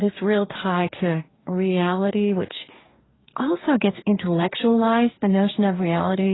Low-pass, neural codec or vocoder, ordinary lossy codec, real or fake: 7.2 kHz; codec, 44.1 kHz, 2.6 kbps, DAC; AAC, 16 kbps; fake